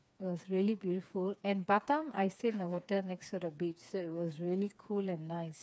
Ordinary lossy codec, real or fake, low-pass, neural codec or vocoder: none; fake; none; codec, 16 kHz, 4 kbps, FreqCodec, smaller model